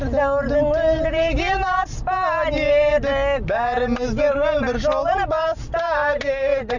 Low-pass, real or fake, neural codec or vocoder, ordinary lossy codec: 7.2 kHz; real; none; none